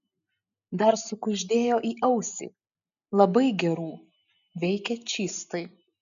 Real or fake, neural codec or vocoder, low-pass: real; none; 7.2 kHz